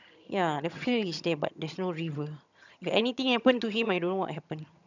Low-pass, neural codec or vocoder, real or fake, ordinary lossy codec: 7.2 kHz; vocoder, 22.05 kHz, 80 mel bands, HiFi-GAN; fake; none